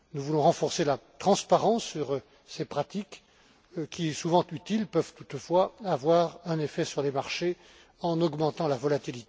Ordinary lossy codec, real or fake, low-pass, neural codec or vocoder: none; real; none; none